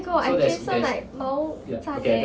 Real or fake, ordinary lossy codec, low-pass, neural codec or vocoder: real; none; none; none